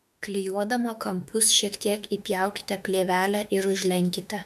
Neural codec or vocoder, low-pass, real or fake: autoencoder, 48 kHz, 32 numbers a frame, DAC-VAE, trained on Japanese speech; 14.4 kHz; fake